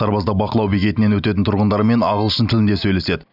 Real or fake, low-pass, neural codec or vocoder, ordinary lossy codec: real; 5.4 kHz; none; none